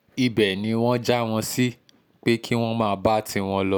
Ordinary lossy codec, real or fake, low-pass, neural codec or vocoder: none; real; none; none